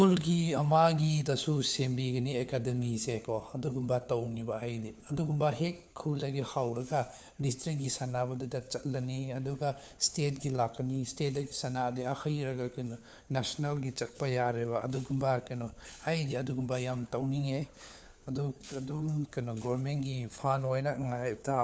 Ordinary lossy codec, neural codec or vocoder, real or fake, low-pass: none; codec, 16 kHz, 4 kbps, FunCodec, trained on LibriTTS, 50 frames a second; fake; none